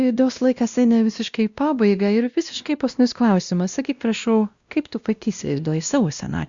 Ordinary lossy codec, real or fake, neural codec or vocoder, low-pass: Opus, 64 kbps; fake; codec, 16 kHz, 1 kbps, X-Codec, WavLM features, trained on Multilingual LibriSpeech; 7.2 kHz